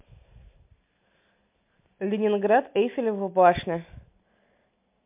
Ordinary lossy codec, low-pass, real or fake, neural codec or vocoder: MP3, 32 kbps; 3.6 kHz; real; none